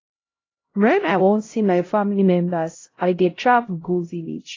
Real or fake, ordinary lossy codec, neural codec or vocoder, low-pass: fake; AAC, 32 kbps; codec, 16 kHz, 0.5 kbps, X-Codec, HuBERT features, trained on LibriSpeech; 7.2 kHz